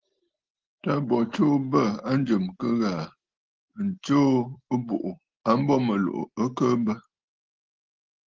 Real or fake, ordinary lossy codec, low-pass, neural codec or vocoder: real; Opus, 16 kbps; 7.2 kHz; none